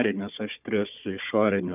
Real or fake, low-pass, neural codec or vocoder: fake; 3.6 kHz; codec, 16 kHz, 4 kbps, FunCodec, trained on Chinese and English, 50 frames a second